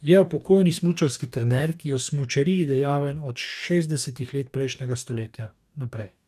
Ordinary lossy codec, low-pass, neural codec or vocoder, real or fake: none; 14.4 kHz; codec, 44.1 kHz, 2.6 kbps, DAC; fake